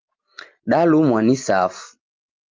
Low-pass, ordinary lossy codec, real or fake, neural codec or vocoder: 7.2 kHz; Opus, 24 kbps; real; none